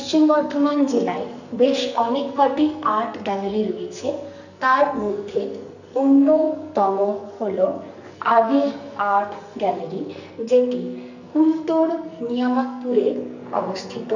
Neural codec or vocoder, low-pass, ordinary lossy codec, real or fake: codec, 44.1 kHz, 2.6 kbps, SNAC; 7.2 kHz; none; fake